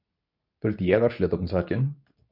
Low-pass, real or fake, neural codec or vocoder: 5.4 kHz; fake; codec, 24 kHz, 0.9 kbps, WavTokenizer, medium speech release version 2